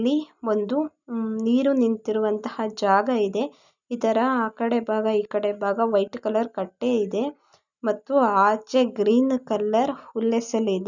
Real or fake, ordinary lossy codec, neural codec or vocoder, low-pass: real; none; none; 7.2 kHz